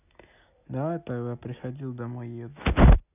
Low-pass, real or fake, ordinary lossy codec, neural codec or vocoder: 3.6 kHz; real; none; none